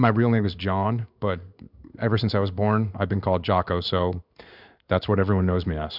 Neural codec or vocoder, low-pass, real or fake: codec, 16 kHz in and 24 kHz out, 1 kbps, XY-Tokenizer; 5.4 kHz; fake